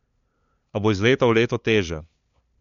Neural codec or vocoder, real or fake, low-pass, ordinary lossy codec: codec, 16 kHz, 2 kbps, FunCodec, trained on LibriTTS, 25 frames a second; fake; 7.2 kHz; MP3, 64 kbps